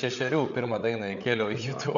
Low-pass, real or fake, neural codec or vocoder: 7.2 kHz; fake; codec, 16 kHz, 8 kbps, FreqCodec, larger model